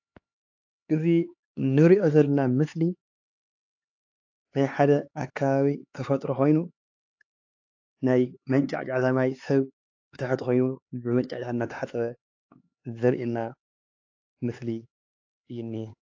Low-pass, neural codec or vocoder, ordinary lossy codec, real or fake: 7.2 kHz; codec, 16 kHz, 4 kbps, X-Codec, HuBERT features, trained on LibriSpeech; AAC, 48 kbps; fake